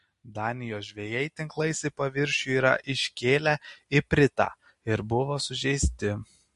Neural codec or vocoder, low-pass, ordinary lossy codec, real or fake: none; 14.4 kHz; MP3, 48 kbps; real